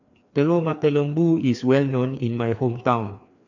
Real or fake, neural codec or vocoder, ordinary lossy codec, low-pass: fake; codec, 16 kHz, 2 kbps, FreqCodec, larger model; none; 7.2 kHz